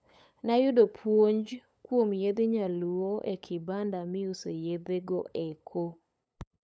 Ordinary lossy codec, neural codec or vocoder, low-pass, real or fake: none; codec, 16 kHz, 8 kbps, FunCodec, trained on LibriTTS, 25 frames a second; none; fake